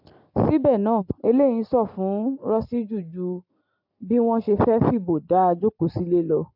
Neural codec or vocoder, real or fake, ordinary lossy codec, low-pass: none; real; AAC, 48 kbps; 5.4 kHz